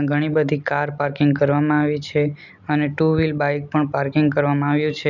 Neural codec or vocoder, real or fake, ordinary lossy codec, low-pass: none; real; none; 7.2 kHz